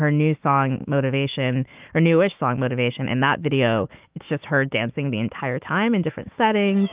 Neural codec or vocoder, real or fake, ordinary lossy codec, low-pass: autoencoder, 48 kHz, 128 numbers a frame, DAC-VAE, trained on Japanese speech; fake; Opus, 32 kbps; 3.6 kHz